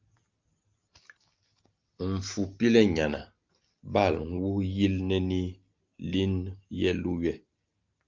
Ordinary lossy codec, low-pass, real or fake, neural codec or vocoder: Opus, 24 kbps; 7.2 kHz; real; none